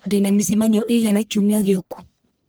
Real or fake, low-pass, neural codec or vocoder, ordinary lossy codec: fake; none; codec, 44.1 kHz, 1.7 kbps, Pupu-Codec; none